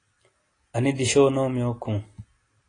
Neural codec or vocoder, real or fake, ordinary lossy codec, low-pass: none; real; AAC, 32 kbps; 9.9 kHz